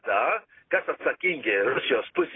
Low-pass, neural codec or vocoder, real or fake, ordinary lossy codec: 7.2 kHz; none; real; AAC, 16 kbps